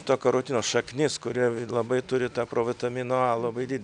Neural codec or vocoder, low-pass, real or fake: none; 9.9 kHz; real